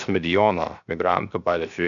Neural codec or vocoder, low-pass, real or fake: codec, 16 kHz, 0.7 kbps, FocalCodec; 7.2 kHz; fake